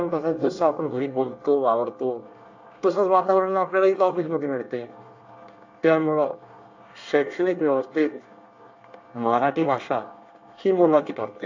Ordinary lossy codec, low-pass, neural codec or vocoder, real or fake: AAC, 48 kbps; 7.2 kHz; codec, 24 kHz, 1 kbps, SNAC; fake